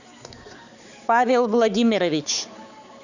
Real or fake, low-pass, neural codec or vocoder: fake; 7.2 kHz; codec, 16 kHz, 4 kbps, X-Codec, HuBERT features, trained on balanced general audio